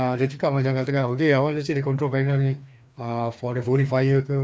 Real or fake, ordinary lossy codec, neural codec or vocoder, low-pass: fake; none; codec, 16 kHz, 2 kbps, FreqCodec, larger model; none